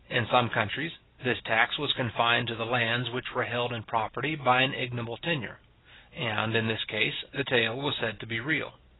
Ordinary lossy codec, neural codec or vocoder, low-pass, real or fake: AAC, 16 kbps; none; 7.2 kHz; real